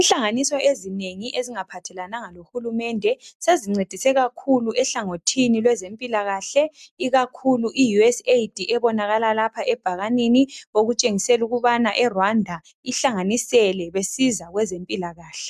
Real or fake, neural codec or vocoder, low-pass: real; none; 14.4 kHz